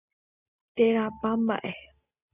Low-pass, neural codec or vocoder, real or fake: 3.6 kHz; none; real